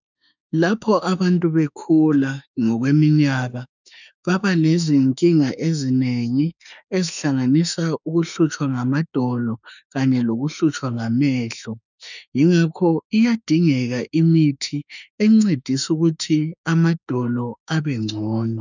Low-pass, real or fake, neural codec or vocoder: 7.2 kHz; fake; autoencoder, 48 kHz, 32 numbers a frame, DAC-VAE, trained on Japanese speech